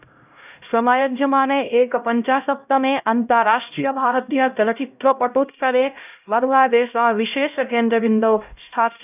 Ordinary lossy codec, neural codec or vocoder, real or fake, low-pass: none; codec, 16 kHz, 0.5 kbps, X-Codec, HuBERT features, trained on LibriSpeech; fake; 3.6 kHz